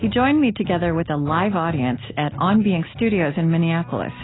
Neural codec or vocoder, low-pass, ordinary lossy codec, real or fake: none; 7.2 kHz; AAC, 16 kbps; real